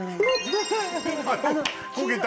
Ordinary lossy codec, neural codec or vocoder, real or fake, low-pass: none; none; real; none